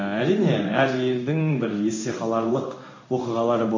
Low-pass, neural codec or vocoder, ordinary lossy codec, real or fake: 7.2 kHz; codec, 16 kHz in and 24 kHz out, 1 kbps, XY-Tokenizer; MP3, 32 kbps; fake